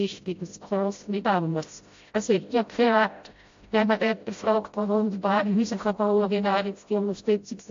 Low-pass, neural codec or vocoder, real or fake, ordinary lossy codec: 7.2 kHz; codec, 16 kHz, 0.5 kbps, FreqCodec, smaller model; fake; AAC, 48 kbps